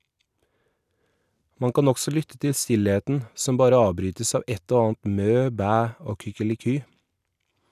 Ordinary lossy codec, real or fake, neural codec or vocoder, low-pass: none; real; none; 14.4 kHz